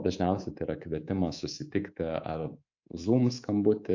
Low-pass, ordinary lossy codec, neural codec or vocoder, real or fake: 7.2 kHz; AAC, 48 kbps; codec, 24 kHz, 3.1 kbps, DualCodec; fake